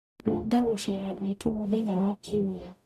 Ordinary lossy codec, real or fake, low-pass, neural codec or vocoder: none; fake; 14.4 kHz; codec, 44.1 kHz, 0.9 kbps, DAC